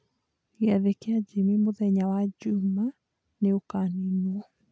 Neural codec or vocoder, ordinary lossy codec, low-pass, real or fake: none; none; none; real